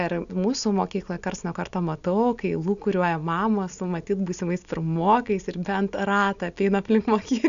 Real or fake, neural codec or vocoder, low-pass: real; none; 7.2 kHz